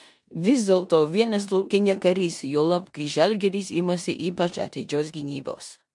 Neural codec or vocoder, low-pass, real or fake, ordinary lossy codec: codec, 16 kHz in and 24 kHz out, 0.9 kbps, LongCat-Audio-Codec, four codebook decoder; 10.8 kHz; fake; MP3, 64 kbps